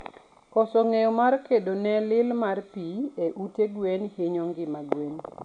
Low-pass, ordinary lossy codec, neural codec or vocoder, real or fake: 9.9 kHz; none; none; real